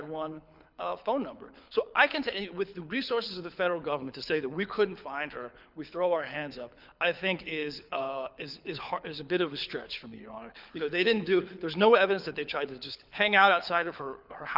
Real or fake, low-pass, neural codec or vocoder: fake; 5.4 kHz; codec, 24 kHz, 6 kbps, HILCodec